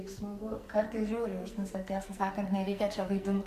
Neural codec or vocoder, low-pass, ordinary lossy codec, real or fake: codec, 44.1 kHz, 2.6 kbps, SNAC; 14.4 kHz; Opus, 64 kbps; fake